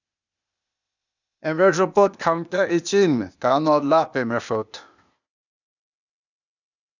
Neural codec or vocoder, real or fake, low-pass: codec, 16 kHz, 0.8 kbps, ZipCodec; fake; 7.2 kHz